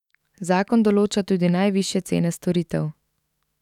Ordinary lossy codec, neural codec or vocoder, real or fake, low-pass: none; autoencoder, 48 kHz, 128 numbers a frame, DAC-VAE, trained on Japanese speech; fake; 19.8 kHz